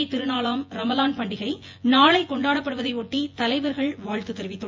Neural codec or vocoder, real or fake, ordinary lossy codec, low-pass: vocoder, 24 kHz, 100 mel bands, Vocos; fake; MP3, 48 kbps; 7.2 kHz